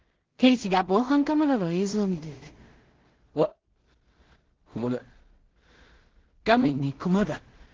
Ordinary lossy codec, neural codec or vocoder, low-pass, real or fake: Opus, 16 kbps; codec, 16 kHz in and 24 kHz out, 0.4 kbps, LongCat-Audio-Codec, two codebook decoder; 7.2 kHz; fake